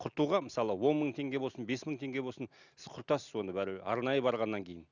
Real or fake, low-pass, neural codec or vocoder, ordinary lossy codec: real; 7.2 kHz; none; none